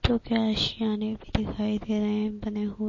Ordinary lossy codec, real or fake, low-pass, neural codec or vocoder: MP3, 32 kbps; real; 7.2 kHz; none